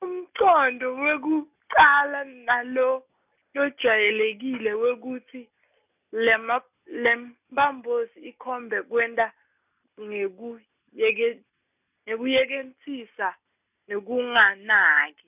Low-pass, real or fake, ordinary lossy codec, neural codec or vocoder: 3.6 kHz; real; none; none